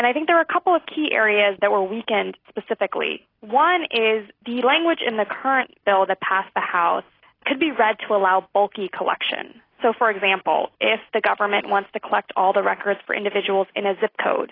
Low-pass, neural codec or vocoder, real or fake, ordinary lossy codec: 5.4 kHz; none; real; AAC, 24 kbps